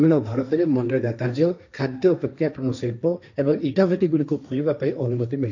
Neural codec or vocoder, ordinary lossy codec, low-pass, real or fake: codec, 16 kHz, 1.1 kbps, Voila-Tokenizer; none; 7.2 kHz; fake